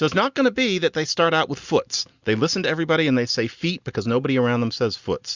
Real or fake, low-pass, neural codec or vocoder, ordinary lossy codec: real; 7.2 kHz; none; Opus, 64 kbps